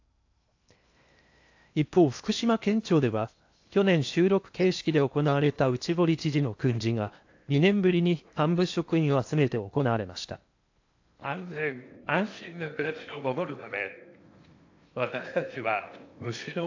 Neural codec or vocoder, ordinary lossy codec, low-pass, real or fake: codec, 16 kHz in and 24 kHz out, 0.8 kbps, FocalCodec, streaming, 65536 codes; AAC, 48 kbps; 7.2 kHz; fake